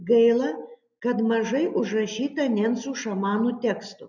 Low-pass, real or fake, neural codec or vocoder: 7.2 kHz; real; none